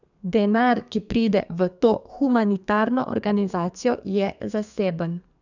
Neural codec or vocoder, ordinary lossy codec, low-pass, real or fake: codec, 32 kHz, 1.9 kbps, SNAC; none; 7.2 kHz; fake